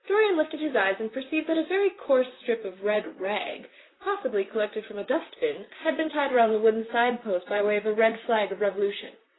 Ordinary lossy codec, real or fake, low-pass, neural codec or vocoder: AAC, 16 kbps; fake; 7.2 kHz; vocoder, 44.1 kHz, 128 mel bands, Pupu-Vocoder